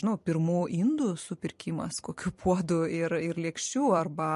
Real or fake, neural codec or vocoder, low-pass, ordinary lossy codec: fake; vocoder, 44.1 kHz, 128 mel bands every 256 samples, BigVGAN v2; 14.4 kHz; MP3, 48 kbps